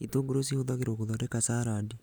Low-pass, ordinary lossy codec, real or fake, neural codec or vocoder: none; none; real; none